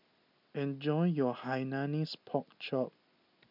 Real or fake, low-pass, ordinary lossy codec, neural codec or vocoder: real; 5.4 kHz; none; none